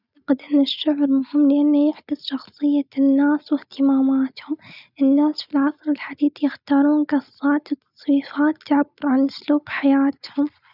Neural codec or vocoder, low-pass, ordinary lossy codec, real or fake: none; 5.4 kHz; none; real